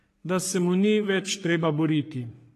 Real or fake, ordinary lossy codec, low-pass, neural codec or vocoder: fake; AAC, 48 kbps; 14.4 kHz; codec, 44.1 kHz, 3.4 kbps, Pupu-Codec